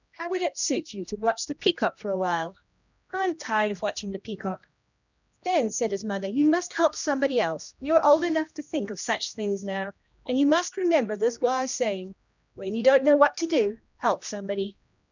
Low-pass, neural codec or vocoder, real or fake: 7.2 kHz; codec, 16 kHz, 1 kbps, X-Codec, HuBERT features, trained on general audio; fake